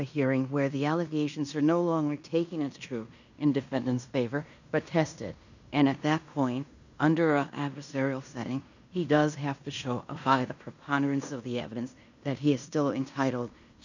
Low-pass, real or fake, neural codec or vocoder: 7.2 kHz; fake; codec, 16 kHz in and 24 kHz out, 0.9 kbps, LongCat-Audio-Codec, fine tuned four codebook decoder